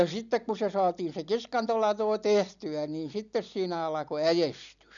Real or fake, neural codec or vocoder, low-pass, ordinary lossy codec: real; none; 7.2 kHz; none